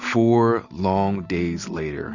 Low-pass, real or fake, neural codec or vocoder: 7.2 kHz; real; none